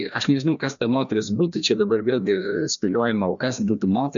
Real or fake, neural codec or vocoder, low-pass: fake; codec, 16 kHz, 1 kbps, FreqCodec, larger model; 7.2 kHz